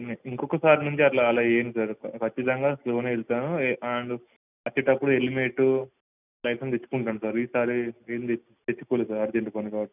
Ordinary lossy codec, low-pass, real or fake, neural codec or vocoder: none; 3.6 kHz; real; none